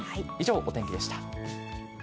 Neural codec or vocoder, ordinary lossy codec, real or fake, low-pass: none; none; real; none